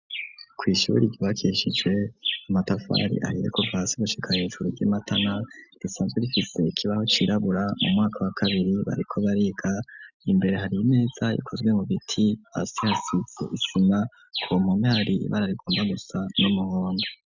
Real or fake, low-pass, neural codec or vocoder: real; 7.2 kHz; none